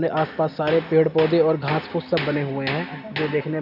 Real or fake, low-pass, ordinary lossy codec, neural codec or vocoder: real; 5.4 kHz; none; none